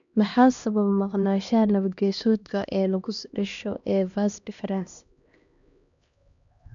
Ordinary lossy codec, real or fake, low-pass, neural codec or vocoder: none; fake; 7.2 kHz; codec, 16 kHz, 1 kbps, X-Codec, HuBERT features, trained on LibriSpeech